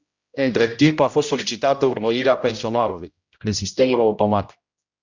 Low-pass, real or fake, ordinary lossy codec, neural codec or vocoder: 7.2 kHz; fake; Opus, 64 kbps; codec, 16 kHz, 0.5 kbps, X-Codec, HuBERT features, trained on general audio